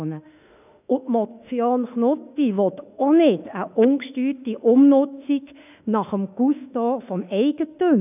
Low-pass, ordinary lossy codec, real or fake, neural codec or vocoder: 3.6 kHz; none; fake; autoencoder, 48 kHz, 32 numbers a frame, DAC-VAE, trained on Japanese speech